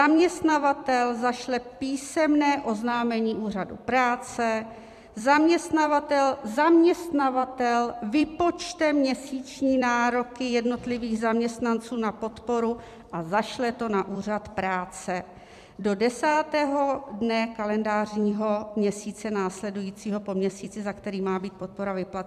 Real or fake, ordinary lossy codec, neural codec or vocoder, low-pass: fake; MP3, 96 kbps; vocoder, 44.1 kHz, 128 mel bands every 256 samples, BigVGAN v2; 14.4 kHz